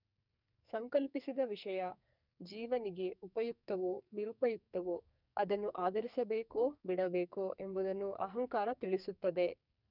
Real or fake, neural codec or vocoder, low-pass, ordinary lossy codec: fake; codec, 44.1 kHz, 2.6 kbps, SNAC; 5.4 kHz; none